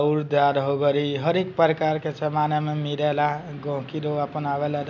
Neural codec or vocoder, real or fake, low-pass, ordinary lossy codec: none; real; 7.2 kHz; MP3, 64 kbps